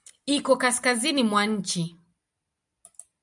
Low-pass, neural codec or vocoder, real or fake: 10.8 kHz; none; real